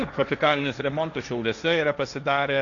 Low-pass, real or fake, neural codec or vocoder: 7.2 kHz; fake; codec, 16 kHz, 1.1 kbps, Voila-Tokenizer